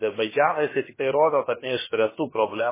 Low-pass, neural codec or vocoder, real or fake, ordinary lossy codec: 3.6 kHz; codec, 16 kHz, about 1 kbps, DyCAST, with the encoder's durations; fake; MP3, 16 kbps